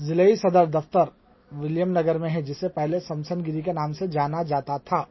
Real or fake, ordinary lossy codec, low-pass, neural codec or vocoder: real; MP3, 24 kbps; 7.2 kHz; none